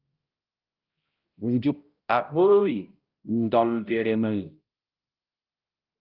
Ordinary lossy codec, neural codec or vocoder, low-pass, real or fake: Opus, 32 kbps; codec, 16 kHz, 0.5 kbps, X-Codec, HuBERT features, trained on balanced general audio; 5.4 kHz; fake